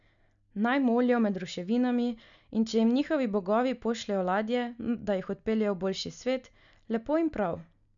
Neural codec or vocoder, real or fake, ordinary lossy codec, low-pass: none; real; none; 7.2 kHz